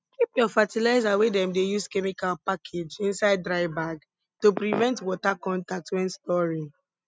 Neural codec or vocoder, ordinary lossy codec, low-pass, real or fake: none; none; none; real